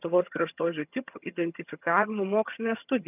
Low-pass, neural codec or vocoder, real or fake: 3.6 kHz; vocoder, 22.05 kHz, 80 mel bands, HiFi-GAN; fake